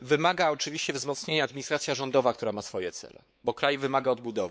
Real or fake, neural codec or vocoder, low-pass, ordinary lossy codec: fake; codec, 16 kHz, 4 kbps, X-Codec, WavLM features, trained on Multilingual LibriSpeech; none; none